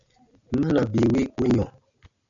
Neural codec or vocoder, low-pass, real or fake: none; 7.2 kHz; real